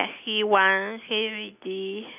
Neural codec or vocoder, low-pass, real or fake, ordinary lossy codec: none; 3.6 kHz; real; none